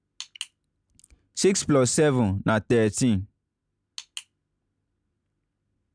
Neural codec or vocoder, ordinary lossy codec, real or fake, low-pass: none; none; real; 9.9 kHz